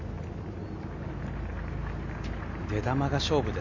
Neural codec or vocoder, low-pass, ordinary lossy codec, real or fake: none; 7.2 kHz; none; real